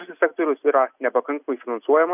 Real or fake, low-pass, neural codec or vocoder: real; 3.6 kHz; none